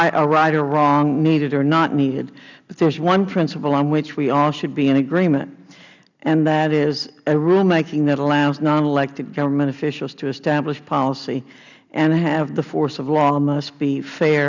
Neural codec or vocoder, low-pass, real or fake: none; 7.2 kHz; real